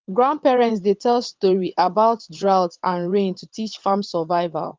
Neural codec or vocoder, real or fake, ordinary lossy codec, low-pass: vocoder, 22.05 kHz, 80 mel bands, WaveNeXt; fake; Opus, 32 kbps; 7.2 kHz